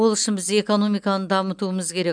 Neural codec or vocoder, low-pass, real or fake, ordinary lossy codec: none; 9.9 kHz; real; none